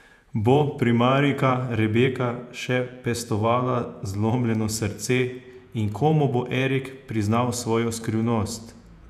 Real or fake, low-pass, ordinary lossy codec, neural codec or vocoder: fake; 14.4 kHz; none; vocoder, 48 kHz, 128 mel bands, Vocos